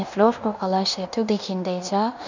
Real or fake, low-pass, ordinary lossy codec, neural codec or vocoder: fake; 7.2 kHz; none; codec, 16 kHz in and 24 kHz out, 0.9 kbps, LongCat-Audio-Codec, fine tuned four codebook decoder